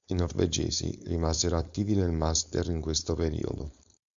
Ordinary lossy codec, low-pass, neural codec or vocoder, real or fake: MP3, 64 kbps; 7.2 kHz; codec, 16 kHz, 4.8 kbps, FACodec; fake